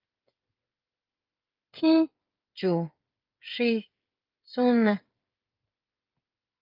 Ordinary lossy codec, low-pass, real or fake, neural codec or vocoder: Opus, 24 kbps; 5.4 kHz; fake; codec, 16 kHz, 8 kbps, FreqCodec, smaller model